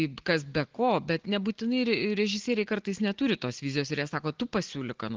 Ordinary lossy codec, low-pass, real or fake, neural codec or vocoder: Opus, 16 kbps; 7.2 kHz; real; none